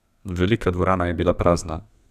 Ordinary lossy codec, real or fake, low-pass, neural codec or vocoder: none; fake; 14.4 kHz; codec, 32 kHz, 1.9 kbps, SNAC